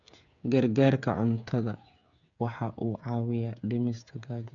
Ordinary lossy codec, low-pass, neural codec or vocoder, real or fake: none; 7.2 kHz; codec, 16 kHz, 8 kbps, FreqCodec, smaller model; fake